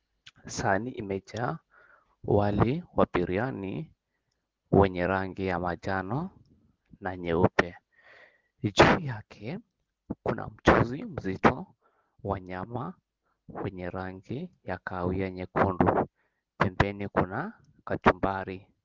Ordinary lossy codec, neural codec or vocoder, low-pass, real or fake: Opus, 16 kbps; none; 7.2 kHz; real